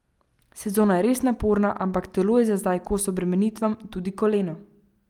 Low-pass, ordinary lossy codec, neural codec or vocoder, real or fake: 19.8 kHz; Opus, 32 kbps; none; real